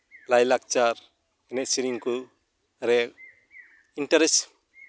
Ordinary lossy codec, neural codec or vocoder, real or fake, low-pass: none; none; real; none